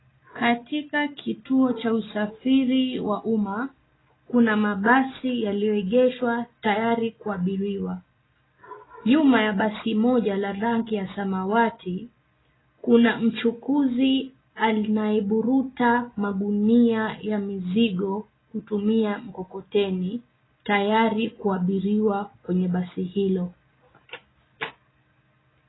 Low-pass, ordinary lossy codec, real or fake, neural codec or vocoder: 7.2 kHz; AAC, 16 kbps; real; none